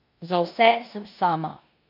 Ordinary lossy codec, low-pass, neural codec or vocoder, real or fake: none; 5.4 kHz; codec, 16 kHz in and 24 kHz out, 0.9 kbps, LongCat-Audio-Codec, four codebook decoder; fake